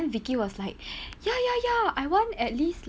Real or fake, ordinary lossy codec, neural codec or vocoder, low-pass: real; none; none; none